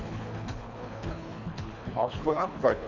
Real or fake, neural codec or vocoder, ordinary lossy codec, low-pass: fake; codec, 24 kHz, 3 kbps, HILCodec; none; 7.2 kHz